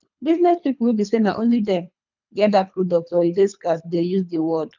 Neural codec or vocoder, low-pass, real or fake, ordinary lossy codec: codec, 24 kHz, 3 kbps, HILCodec; 7.2 kHz; fake; none